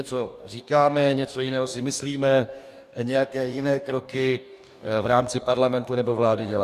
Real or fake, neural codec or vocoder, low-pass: fake; codec, 44.1 kHz, 2.6 kbps, DAC; 14.4 kHz